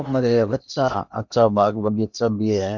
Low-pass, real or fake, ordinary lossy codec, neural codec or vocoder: 7.2 kHz; fake; none; codec, 16 kHz in and 24 kHz out, 0.8 kbps, FocalCodec, streaming, 65536 codes